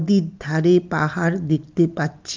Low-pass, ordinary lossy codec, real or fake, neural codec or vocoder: 7.2 kHz; Opus, 32 kbps; real; none